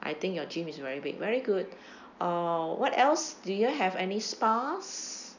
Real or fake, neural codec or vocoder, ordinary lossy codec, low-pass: real; none; none; 7.2 kHz